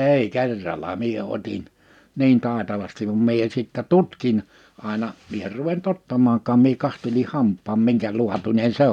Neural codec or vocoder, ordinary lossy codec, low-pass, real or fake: none; none; 19.8 kHz; real